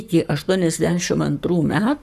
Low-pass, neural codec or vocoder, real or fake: 14.4 kHz; codec, 44.1 kHz, 7.8 kbps, Pupu-Codec; fake